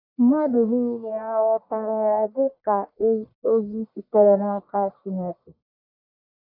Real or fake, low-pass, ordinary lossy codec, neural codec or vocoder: fake; 5.4 kHz; none; codec, 44.1 kHz, 1.7 kbps, Pupu-Codec